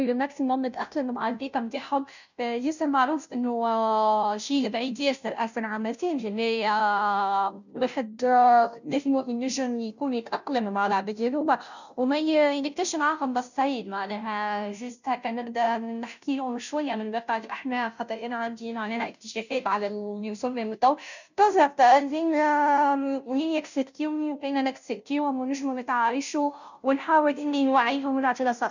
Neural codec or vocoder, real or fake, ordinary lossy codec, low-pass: codec, 16 kHz, 0.5 kbps, FunCodec, trained on Chinese and English, 25 frames a second; fake; none; 7.2 kHz